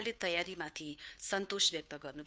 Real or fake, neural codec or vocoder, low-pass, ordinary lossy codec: fake; codec, 16 kHz, 2 kbps, FunCodec, trained on Chinese and English, 25 frames a second; none; none